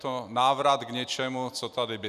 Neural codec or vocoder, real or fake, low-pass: none; real; 14.4 kHz